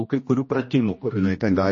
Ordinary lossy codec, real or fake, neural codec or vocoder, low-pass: MP3, 32 kbps; fake; codec, 16 kHz, 1 kbps, X-Codec, HuBERT features, trained on general audio; 7.2 kHz